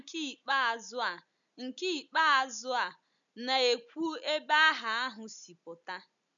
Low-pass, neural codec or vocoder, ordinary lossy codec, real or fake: 7.2 kHz; none; none; real